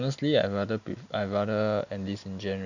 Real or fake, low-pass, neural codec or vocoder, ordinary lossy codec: real; 7.2 kHz; none; none